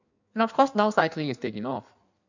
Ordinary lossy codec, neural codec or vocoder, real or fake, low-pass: none; codec, 16 kHz in and 24 kHz out, 1.1 kbps, FireRedTTS-2 codec; fake; 7.2 kHz